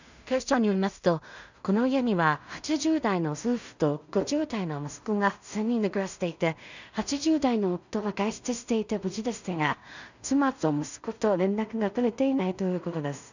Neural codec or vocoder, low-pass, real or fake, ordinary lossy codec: codec, 16 kHz in and 24 kHz out, 0.4 kbps, LongCat-Audio-Codec, two codebook decoder; 7.2 kHz; fake; none